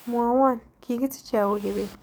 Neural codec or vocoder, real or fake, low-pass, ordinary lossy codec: codec, 44.1 kHz, 7.8 kbps, DAC; fake; none; none